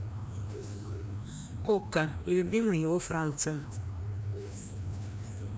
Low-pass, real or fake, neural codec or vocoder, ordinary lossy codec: none; fake; codec, 16 kHz, 1 kbps, FreqCodec, larger model; none